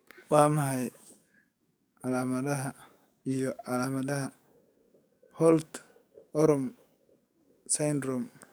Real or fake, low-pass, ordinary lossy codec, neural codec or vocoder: fake; none; none; codec, 44.1 kHz, 7.8 kbps, DAC